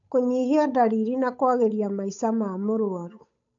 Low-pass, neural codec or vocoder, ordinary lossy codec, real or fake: 7.2 kHz; codec, 16 kHz, 8 kbps, FunCodec, trained on Chinese and English, 25 frames a second; AAC, 64 kbps; fake